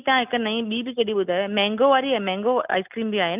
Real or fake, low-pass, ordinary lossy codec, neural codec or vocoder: real; 3.6 kHz; none; none